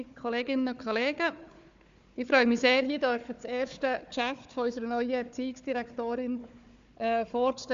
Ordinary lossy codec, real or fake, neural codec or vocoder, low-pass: MP3, 64 kbps; fake; codec, 16 kHz, 4 kbps, FunCodec, trained on Chinese and English, 50 frames a second; 7.2 kHz